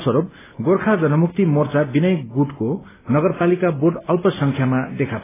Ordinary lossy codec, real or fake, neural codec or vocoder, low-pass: AAC, 16 kbps; real; none; 3.6 kHz